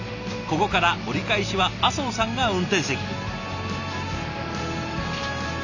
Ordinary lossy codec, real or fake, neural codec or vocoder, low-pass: none; real; none; 7.2 kHz